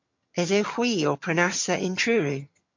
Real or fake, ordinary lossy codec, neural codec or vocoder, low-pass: fake; MP3, 48 kbps; vocoder, 22.05 kHz, 80 mel bands, HiFi-GAN; 7.2 kHz